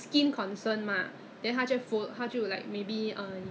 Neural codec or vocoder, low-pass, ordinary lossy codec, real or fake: none; none; none; real